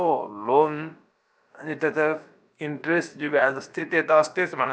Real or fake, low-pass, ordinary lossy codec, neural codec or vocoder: fake; none; none; codec, 16 kHz, about 1 kbps, DyCAST, with the encoder's durations